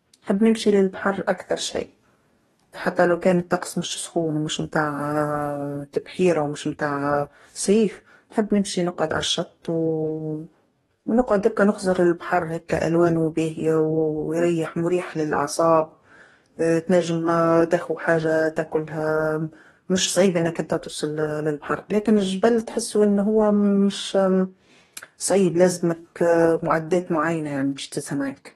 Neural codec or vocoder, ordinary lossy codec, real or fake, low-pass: codec, 44.1 kHz, 2.6 kbps, DAC; AAC, 32 kbps; fake; 19.8 kHz